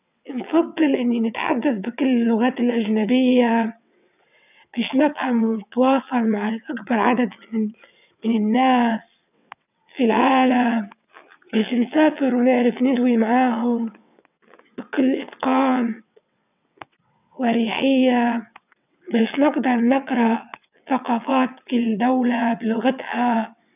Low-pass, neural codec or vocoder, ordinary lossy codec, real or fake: 3.6 kHz; vocoder, 22.05 kHz, 80 mel bands, WaveNeXt; none; fake